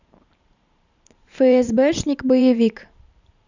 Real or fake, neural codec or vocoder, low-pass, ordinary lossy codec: fake; vocoder, 44.1 kHz, 128 mel bands every 512 samples, BigVGAN v2; 7.2 kHz; none